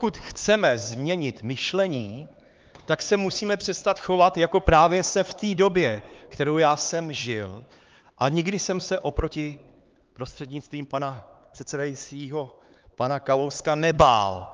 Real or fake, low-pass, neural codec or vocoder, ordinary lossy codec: fake; 7.2 kHz; codec, 16 kHz, 4 kbps, X-Codec, HuBERT features, trained on LibriSpeech; Opus, 24 kbps